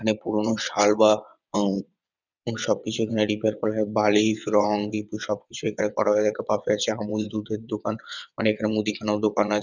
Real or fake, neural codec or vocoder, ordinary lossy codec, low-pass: fake; vocoder, 22.05 kHz, 80 mel bands, WaveNeXt; none; 7.2 kHz